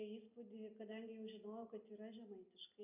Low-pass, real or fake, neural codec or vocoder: 3.6 kHz; real; none